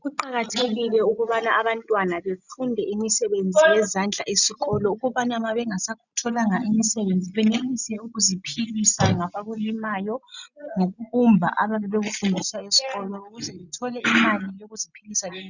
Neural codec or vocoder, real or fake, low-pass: none; real; 7.2 kHz